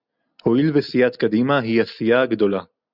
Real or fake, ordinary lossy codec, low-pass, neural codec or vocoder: real; Opus, 64 kbps; 5.4 kHz; none